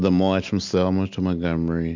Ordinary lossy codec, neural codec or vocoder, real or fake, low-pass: MP3, 64 kbps; none; real; 7.2 kHz